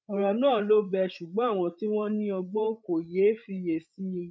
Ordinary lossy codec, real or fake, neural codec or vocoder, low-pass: none; fake; codec, 16 kHz, 8 kbps, FreqCodec, larger model; none